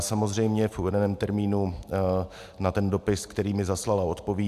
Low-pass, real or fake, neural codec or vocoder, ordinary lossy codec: 14.4 kHz; real; none; AAC, 96 kbps